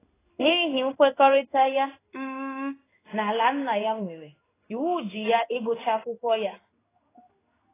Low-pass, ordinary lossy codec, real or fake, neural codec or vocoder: 3.6 kHz; AAC, 16 kbps; fake; codec, 16 kHz in and 24 kHz out, 1 kbps, XY-Tokenizer